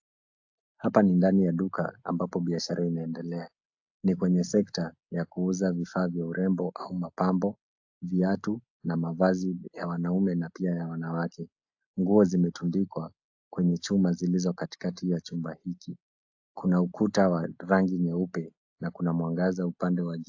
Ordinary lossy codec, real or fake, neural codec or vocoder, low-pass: AAC, 48 kbps; real; none; 7.2 kHz